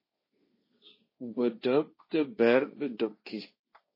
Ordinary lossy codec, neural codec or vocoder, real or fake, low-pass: MP3, 24 kbps; codec, 16 kHz, 1.1 kbps, Voila-Tokenizer; fake; 5.4 kHz